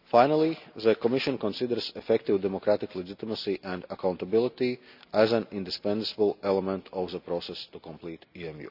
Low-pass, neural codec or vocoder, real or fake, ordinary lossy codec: 5.4 kHz; none; real; none